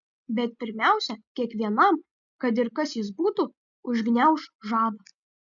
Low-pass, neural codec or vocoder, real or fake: 7.2 kHz; none; real